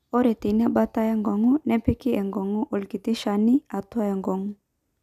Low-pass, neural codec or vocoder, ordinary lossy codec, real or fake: 14.4 kHz; none; none; real